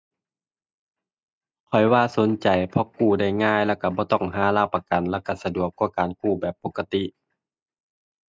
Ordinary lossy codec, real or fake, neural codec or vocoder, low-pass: none; real; none; none